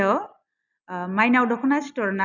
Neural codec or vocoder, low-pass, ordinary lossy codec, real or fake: none; 7.2 kHz; none; real